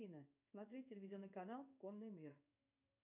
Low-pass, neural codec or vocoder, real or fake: 3.6 kHz; codec, 16 kHz in and 24 kHz out, 1 kbps, XY-Tokenizer; fake